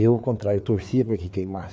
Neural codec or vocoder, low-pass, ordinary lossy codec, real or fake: codec, 16 kHz, 4 kbps, FreqCodec, larger model; none; none; fake